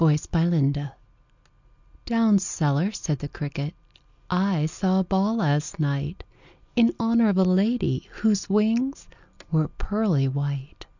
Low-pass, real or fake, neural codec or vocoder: 7.2 kHz; real; none